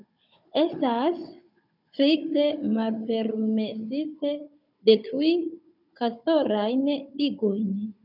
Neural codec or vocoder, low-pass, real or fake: codec, 16 kHz, 16 kbps, FunCodec, trained on Chinese and English, 50 frames a second; 5.4 kHz; fake